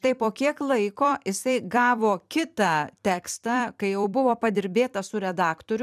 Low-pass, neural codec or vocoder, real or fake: 14.4 kHz; vocoder, 44.1 kHz, 128 mel bands every 256 samples, BigVGAN v2; fake